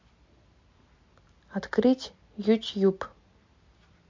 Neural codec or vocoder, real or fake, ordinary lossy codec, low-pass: none; real; MP3, 48 kbps; 7.2 kHz